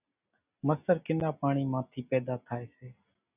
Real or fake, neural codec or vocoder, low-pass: real; none; 3.6 kHz